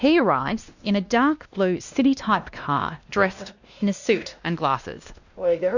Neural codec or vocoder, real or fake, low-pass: codec, 16 kHz, 1 kbps, X-Codec, WavLM features, trained on Multilingual LibriSpeech; fake; 7.2 kHz